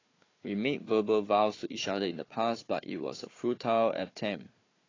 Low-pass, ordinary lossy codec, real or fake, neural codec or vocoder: 7.2 kHz; AAC, 32 kbps; fake; codec, 16 kHz, 4 kbps, FunCodec, trained on Chinese and English, 50 frames a second